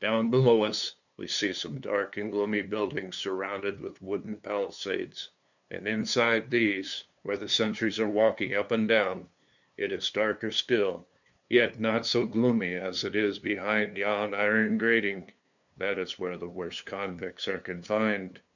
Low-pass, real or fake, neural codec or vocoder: 7.2 kHz; fake; codec, 16 kHz, 2 kbps, FunCodec, trained on LibriTTS, 25 frames a second